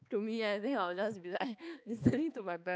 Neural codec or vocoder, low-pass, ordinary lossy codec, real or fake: codec, 16 kHz, 2 kbps, X-Codec, HuBERT features, trained on balanced general audio; none; none; fake